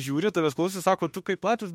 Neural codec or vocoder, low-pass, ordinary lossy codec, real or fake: autoencoder, 48 kHz, 32 numbers a frame, DAC-VAE, trained on Japanese speech; 19.8 kHz; MP3, 64 kbps; fake